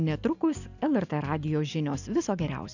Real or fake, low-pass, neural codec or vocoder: real; 7.2 kHz; none